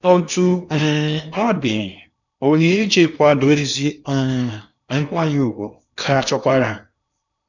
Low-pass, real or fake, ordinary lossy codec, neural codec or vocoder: 7.2 kHz; fake; none; codec, 16 kHz in and 24 kHz out, 0.8 kbps, FocalCodec, streaming, 65536 codes